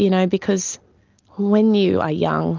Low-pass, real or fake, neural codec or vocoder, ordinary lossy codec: 7.2 kHz; real; none; Opus, 24 kbps